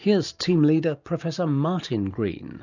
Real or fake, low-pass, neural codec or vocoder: real; 7.2 kHz; none